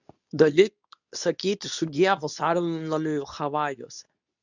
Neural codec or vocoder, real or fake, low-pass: codec, 24 kHz, 0.9 kbps, WavTokenizer, medium speech release version 2; fake; 7.2 kHz